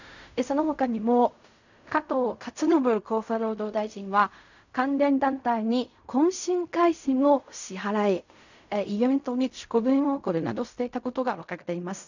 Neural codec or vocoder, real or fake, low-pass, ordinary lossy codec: codec, 16 kHz in and 24 kHz out, 0.4 kbps, LongCat-Audio-Codec, fine tuned four codebook decoder; fake; 7.2 kHz; none